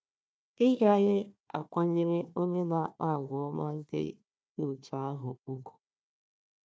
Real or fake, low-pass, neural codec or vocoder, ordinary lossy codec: fake; none; codec, 16 kHz, 1 kbps, FunCodec, trained on Chinese and English, 50 frames a second; none